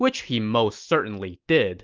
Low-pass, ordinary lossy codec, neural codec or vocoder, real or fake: 7.2 kHz; Opus, 24 kbps; none; real